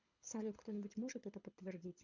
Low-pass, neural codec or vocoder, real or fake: 7.2 kHz; codec, 24 kHz, 6 kbps, HILCodec; fake